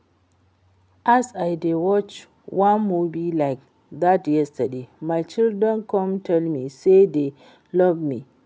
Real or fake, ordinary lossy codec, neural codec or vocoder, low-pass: real; none; none; none